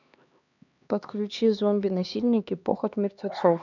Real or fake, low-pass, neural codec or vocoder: fake; 7.2 kHz; codec, 16 kHz, 2 kbps, X-Codec, HuBERT features, trained on LibriSpeech